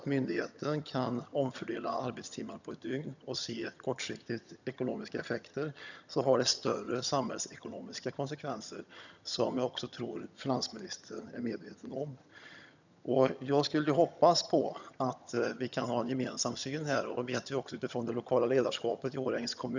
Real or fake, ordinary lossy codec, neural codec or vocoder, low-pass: fake; none; vocoder, 22.05 kHz, 80 mel bands, HiFi-GAN; 7.2 kHz